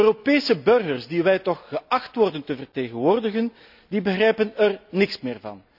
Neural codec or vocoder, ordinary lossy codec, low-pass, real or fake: none; none; 5.4 kHz; real